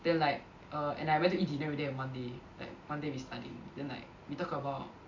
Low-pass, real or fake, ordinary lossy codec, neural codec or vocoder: 7.2 kHz; real; MP3, 48 kbps; none